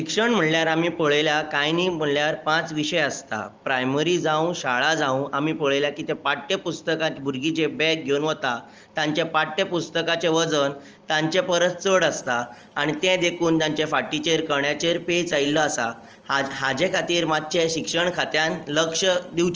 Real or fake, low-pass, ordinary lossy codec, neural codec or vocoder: real; 7.2 kHz; Opus, 24 kbps; none